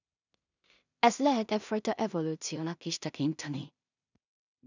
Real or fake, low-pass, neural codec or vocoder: fake; 7.2 kHz; codec, 16 kHz in and 24 kHz out, 0.4 kbps, LongCat-Audio-Codec, two codebook decoder